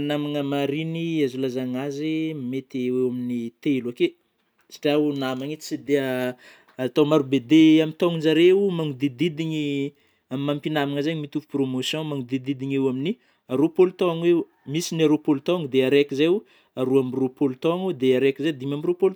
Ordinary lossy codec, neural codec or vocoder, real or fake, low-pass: none; none; real; none